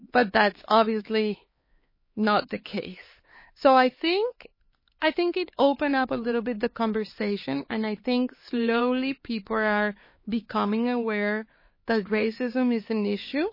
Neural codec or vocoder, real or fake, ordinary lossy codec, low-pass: codec, 16 kHz, 4 kbps, X-Codec, HuBERT features, trained on LibriSpeech; fake; MP3, 24 kbps; 5.4 kHz